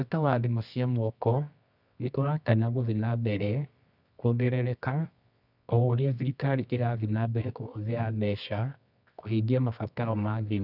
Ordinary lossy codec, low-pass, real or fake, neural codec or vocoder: none; 5.4 kHz; fake; codec, 24 kHz, 0.9 kbps, WavTokenizer, medium music audio release